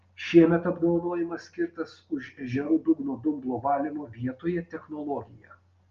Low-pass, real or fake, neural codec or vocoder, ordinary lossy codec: 7.2 kHz; real; none; Opus, 32 kbps